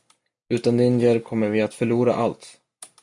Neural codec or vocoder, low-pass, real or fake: none; 10.8 kHz; real